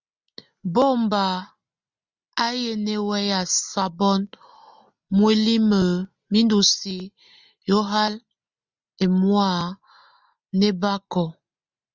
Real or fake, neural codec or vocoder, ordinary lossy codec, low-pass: real; none; Opus, 64 kbps; 7.2 kHz